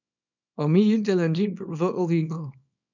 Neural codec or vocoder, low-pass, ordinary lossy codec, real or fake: codec, 24 kHz, 0.9 kbps, WavTokenizer, small release; 7.2 kHz; none; fake